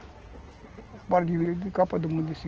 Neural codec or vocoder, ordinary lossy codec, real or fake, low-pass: none; Opus, 16 kbps; real; 7.2 kHz